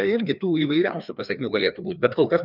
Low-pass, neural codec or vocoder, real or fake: 5.4 kHz; codec, 16 kHz, 2 kbps, FreqCodec, larger model; fake